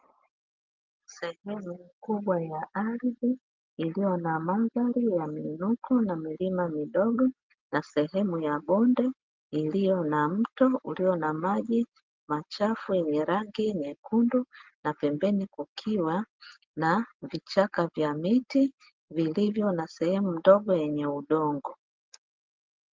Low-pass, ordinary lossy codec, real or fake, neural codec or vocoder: 7.2 kHz; Opus, 32 kbps; real; none